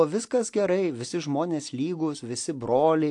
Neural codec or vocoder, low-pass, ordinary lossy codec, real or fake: none; 10.8 kHz; AAC, 64 kbps; real